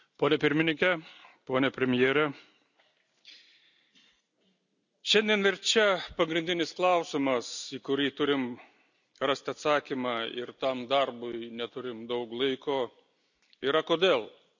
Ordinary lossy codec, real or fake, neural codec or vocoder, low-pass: none; real; none; 7.2 kHz